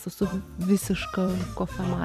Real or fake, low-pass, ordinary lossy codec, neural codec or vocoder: fake; 14.4 kHz; MP3, 96 kbps; vocoder, 44.1 kHz, 128 mel bands every 256 samples, BigVGAN v2